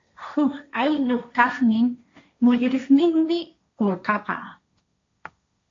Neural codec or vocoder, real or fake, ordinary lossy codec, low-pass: codec, 16 kHz, 1.1 kbps, Voila-Tokenizer; fake; AAC, 48 kbps; 7.2 kHz